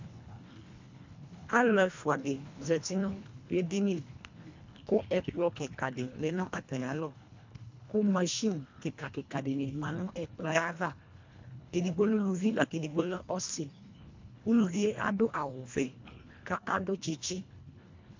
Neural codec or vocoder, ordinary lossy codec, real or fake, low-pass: codec, 24 kHz, 1.5 kbps, HILCodec; MP3, 64 kbps; fake; 7.2 kHz